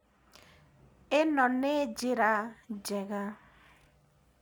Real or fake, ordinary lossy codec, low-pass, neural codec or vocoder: real; none; none; none